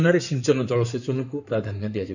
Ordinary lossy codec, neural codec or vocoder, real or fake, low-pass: none; codec, 16 kHz in and 24 kHz out, 2.2 kbps, FireRedTTS-2 codec; fake; 7.2 kHz